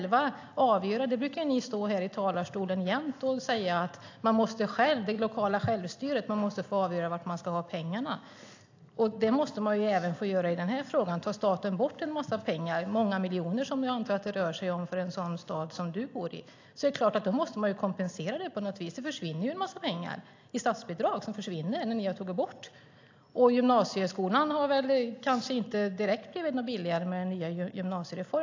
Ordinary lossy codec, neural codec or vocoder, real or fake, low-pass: AAC, 48 kbps; none; real; 7.2 kHz